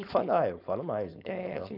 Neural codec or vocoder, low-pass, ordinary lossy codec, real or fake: codec, 16 kHz, 4.8 kbps, FACodec; 5.4 kHz; none; fake